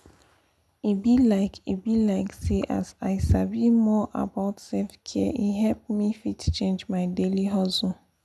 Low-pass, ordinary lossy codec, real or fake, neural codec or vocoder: none; none; real; none